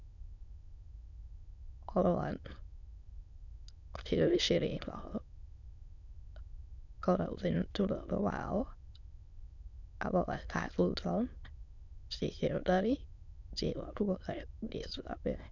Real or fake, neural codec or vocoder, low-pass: fake; autoencoder, 22.05 kHz, a latent of 192 numbers a frame, VITS, trained on many speakers; 7.2 kHz